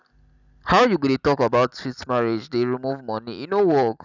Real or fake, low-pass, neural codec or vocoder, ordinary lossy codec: real; 7.2 kHz; none; none